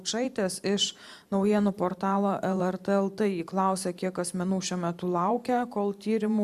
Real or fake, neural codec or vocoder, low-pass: fake; vocoder, 44.1 kHz, 128 mel bands every 512 samples, BigVGAN v2; 14.4 kHz